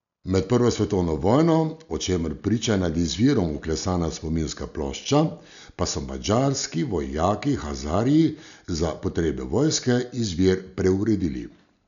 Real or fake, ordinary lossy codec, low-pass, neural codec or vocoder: real; none; 7.2 kHz; none